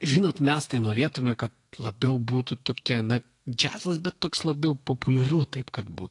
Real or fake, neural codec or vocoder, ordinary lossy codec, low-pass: fake; codec, 32 kHz, 1.9 kbps, SNAC; AAC, 48 kbps; 10.8 kHz